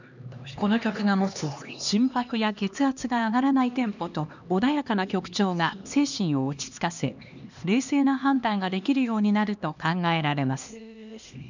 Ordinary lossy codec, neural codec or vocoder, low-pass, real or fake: none; codec, 16 kHz, 2 kbps, X-Codec, HuBERT features, trained on LibriSpeech; 7.2 kHz; fake